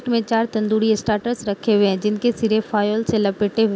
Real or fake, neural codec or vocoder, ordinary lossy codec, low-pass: real; none; none; none